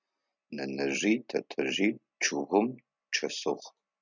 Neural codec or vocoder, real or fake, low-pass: none; real; 7.2 kHz